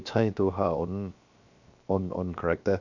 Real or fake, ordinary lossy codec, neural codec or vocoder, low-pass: fake; none; codec, 16 kHz, 0.7 kbps, FocalCodec; 7.2 kHz